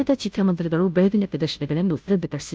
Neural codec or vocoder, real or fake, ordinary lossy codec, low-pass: codec, 16 kHz, 0.5 kbps, FunCodec, trained on Chinese and English, 25 frames a second; fake; none; none